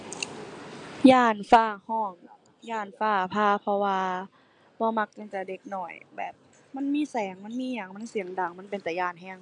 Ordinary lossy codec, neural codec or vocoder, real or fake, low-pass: none; none; real; 9.9 kHz